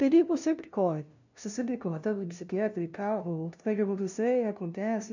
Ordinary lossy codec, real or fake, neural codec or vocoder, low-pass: none; fake; codec, 16 kHz, 0.5 kbps, FunCodec, trained on LibriTTS, 25 frames a second; 7.2 kHz